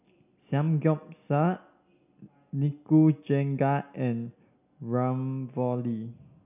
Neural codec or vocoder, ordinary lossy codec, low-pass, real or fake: none; none; 3.6 kHz; real